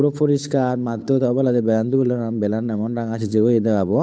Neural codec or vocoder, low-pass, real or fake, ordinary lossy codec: codec, 16 kHz, 8 kbps, FunCodec, trained on Chinese and English, 25 frames a second; none; fake; none